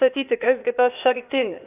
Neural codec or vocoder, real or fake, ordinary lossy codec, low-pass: codec, 16 kHz, 0.8 kbps, ZipCodec; fake; AAC, 32 kbps; 3.6 kHz